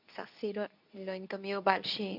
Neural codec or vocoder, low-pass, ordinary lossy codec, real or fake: codec, 24 kHz, 0.9 kbps, WavTokenizer, medium speech release version 2; 5.4 kHz; none; fake